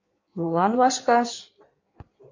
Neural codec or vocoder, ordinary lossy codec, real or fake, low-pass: codec, 16 kHz in and 24 kHz out, 1.1 kbps, FireRedTTS-2 codec; MP3, 48 kbps; fake; 7.2 kHz